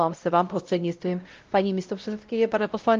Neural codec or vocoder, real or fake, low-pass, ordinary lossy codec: codec, 16 kHz, 0.5 kbps, X-Codec, WavLM features, trained on Multilingual LibriSpeech; fake; 7.2 kHz; Opus, 24 kbps